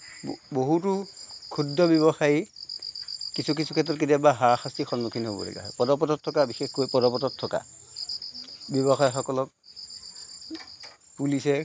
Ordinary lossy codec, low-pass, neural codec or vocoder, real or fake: none; none; none; real